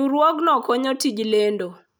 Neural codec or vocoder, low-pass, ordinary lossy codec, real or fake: none; none; none; real